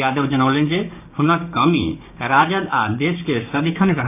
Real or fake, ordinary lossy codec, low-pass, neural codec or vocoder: fake; AAC, 32 kbps; 3.6 kHz; codec, 16 kHz, 6 kbps, DAC